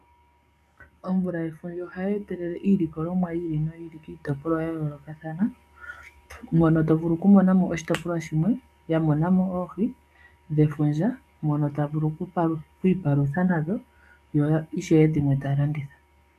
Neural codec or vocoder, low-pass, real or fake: codec, 44.1 kHz, 7.8 kbps, DAC; 14.4 kHz; fake